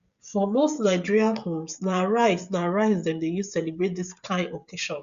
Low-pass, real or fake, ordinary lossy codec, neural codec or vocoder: 7.2 kHz; fake; Opus, 64 kbps; codec, 16 kHz, 16 kbps, FreqCodec, smaller model